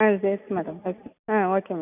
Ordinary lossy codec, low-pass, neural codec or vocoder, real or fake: none; 3.6 kHz; none; real